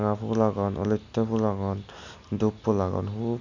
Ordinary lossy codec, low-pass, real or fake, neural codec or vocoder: none; 7.2 kHz; real; none